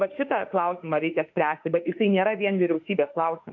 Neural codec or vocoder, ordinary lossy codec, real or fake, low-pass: autoencoder, 48 kHz, 32 numbers a frame, DAC-VAE, trained on Japanese speech; MP3, 64 kbps; fake; 7.2 kHz